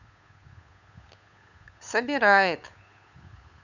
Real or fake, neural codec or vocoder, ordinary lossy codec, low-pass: fake; codec, 16 kHz, 8 kbps, FunCodec, trained on Chinese and English, 25 frames a second; none; 7.2 kHz